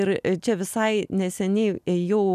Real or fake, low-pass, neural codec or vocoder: real; 14.4 kHz; none